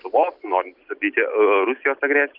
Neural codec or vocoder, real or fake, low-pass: none; real; 5.4 kHz